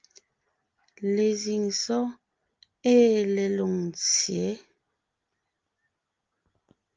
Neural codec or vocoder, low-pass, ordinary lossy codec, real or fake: none; 7.2 kHz; Opus, 24 kbps; real